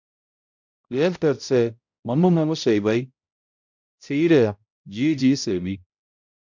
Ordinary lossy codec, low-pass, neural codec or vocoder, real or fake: MP3, 64 kbps; 7.2 kHz; codec, 16 kHz, 0.5 kbps, X-Codec, HuBERT features, trained on balanced general audio; fake